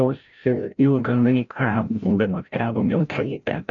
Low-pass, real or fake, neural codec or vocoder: 7.2 kHz; fake; codec, 16 kHz, 0.5 kbps, FreqCodec, larger model